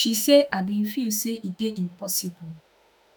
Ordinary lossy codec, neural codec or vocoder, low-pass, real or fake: none; autoencoder, 48 kHz, 32 numbers a frame, DAC-VAE, trained on Japanese speech; none; fake